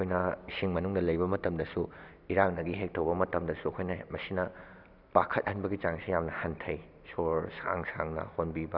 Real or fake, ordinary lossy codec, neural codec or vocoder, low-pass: real; none; none; 5.4 kHz